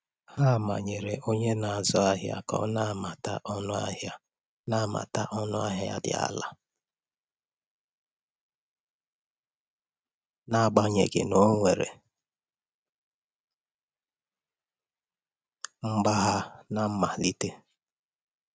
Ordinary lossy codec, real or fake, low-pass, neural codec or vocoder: none; real; none; none